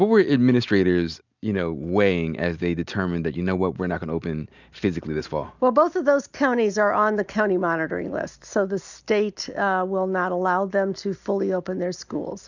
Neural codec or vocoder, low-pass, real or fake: none; 7.2 kHz; real